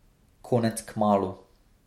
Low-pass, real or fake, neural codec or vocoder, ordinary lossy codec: 19.8 kHz; real; none; MP3, 64 kbps